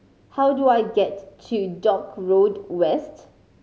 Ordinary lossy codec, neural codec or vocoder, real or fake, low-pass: none; none; real; none